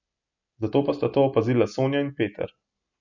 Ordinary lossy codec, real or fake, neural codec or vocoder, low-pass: none; real; none; 7.2 kHz